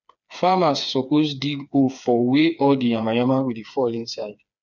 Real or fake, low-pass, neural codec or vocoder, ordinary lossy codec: fake; 7.2 kHz; codec, 16 kHz, 4 kbps, FreqCodec, smaller model; none